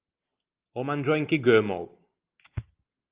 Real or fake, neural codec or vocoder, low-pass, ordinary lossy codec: real; none; 3.6 kHz; Opus, 32 kbps